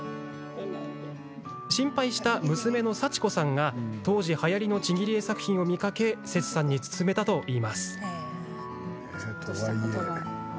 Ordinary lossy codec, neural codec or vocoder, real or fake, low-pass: none; none; real; none